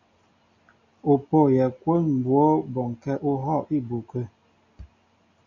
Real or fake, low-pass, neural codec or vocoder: real; 7.2 kHz; none